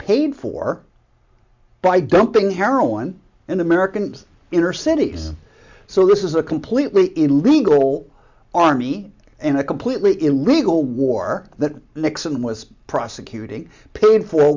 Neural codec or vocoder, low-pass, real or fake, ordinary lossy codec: none; 7.2 kHz; real; MP3, 64 kbps